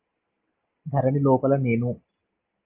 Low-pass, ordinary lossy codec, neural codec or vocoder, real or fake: 3.6 kHz; Opus, 24 kbps; none; real